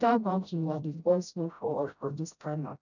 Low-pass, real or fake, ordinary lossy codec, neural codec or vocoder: 7.2 kHz; fake; none; codec, 16 kHz, 0.5 kbps, FreqCodec, smaller model